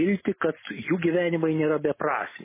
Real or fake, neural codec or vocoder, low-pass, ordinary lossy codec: real; none; 3.6 kHz; MP3, 16 kbps